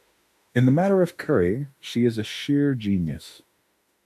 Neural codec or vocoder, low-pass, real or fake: autoencoder, 48 kHz, 32 numbers a frame, DAC-VAE, trained on Japanese speech; 14.4 kHz; fake